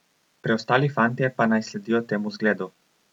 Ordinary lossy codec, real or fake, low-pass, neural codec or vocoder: none; real; 19.8 kHz; none